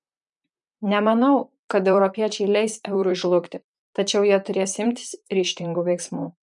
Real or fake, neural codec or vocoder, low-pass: fake; vocoder, 44.1 kHz, 128 mel bands, Pupu-Vocoder; 10.8 kHz